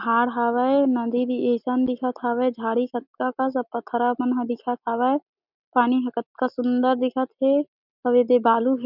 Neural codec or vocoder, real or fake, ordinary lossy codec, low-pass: none; real; none; 5.4 kHz